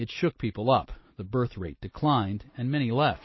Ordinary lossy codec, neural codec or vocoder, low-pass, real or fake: MP3, 24 kbps; none; 7.2 kHz; real